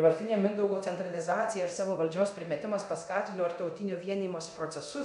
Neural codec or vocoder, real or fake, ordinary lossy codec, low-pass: codec, 24 kHz, 0.9 kbps, DualCodec; fake; MP3, 96 kbps; 10.8 kHz